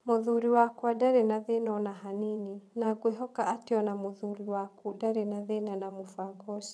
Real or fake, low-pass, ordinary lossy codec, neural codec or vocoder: fake; none; none; vocoder, 22.05 kHz, 80 mel bands, WaveNeXt